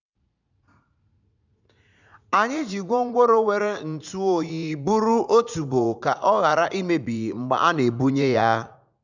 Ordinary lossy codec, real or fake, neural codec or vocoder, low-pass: none; fake; vocoder, 44.1 kHz, 80 mel bands, Vocos; 7.2 kHz